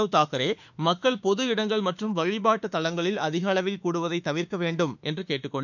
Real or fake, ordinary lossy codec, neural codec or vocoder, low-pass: fake; none; autoencoder, 48 kHz, 32 numbers a frame, DAC-VAE, trained on Japanese speech; 7.2 kHz